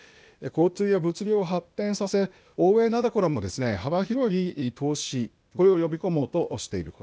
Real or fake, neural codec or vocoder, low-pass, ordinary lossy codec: fake; codec, 16 kHz, 0.8 kbps, ZipCodec; none; none